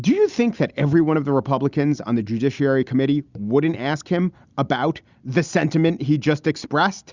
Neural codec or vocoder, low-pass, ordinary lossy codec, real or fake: none; 7.2 kHz; Opus, 64 kbps; real